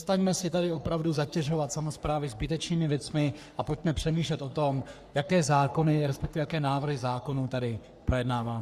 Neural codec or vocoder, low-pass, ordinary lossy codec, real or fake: codec, 44.1 kHz, 3.4 kbps, Pupu-Codec; 14.4 kHz; Opus, 64 kbps; fake